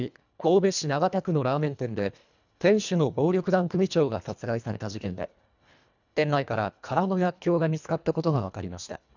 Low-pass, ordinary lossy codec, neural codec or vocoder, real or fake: 7.2 kHz; none; codec, 24 kHz, 1.5 kbps, HILCodec; fake